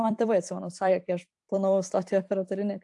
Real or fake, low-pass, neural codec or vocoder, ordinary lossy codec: fake; 10.8 kHz; vocoder, 44.1 kHz, 128 mel bands every 256 samples, BigVGAN v2; AAC, 64 kbps